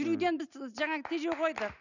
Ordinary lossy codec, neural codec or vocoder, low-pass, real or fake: none; none; 7.2 kHz; real